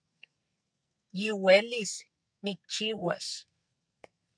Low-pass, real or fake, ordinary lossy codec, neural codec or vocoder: 9.9 kHz; fake; MP3, 96 kbps; codec, 44.1 kHz, 2.6 kbps, SNAC